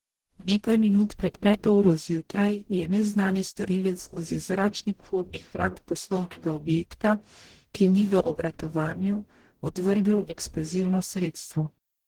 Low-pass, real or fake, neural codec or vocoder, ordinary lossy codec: 19.8 kHz; fake; codec, 44.1 kHz, 0.9 kbps, DAC; Opus, 16 kbps